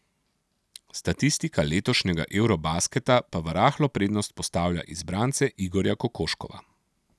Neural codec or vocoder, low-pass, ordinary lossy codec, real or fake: none; none; none; real